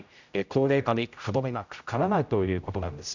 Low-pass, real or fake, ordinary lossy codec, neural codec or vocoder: 7.2 kHz; fake; none; codec, 16 kHz, 0.5 kbps, X-Codec, HuBERT features, trained on general audio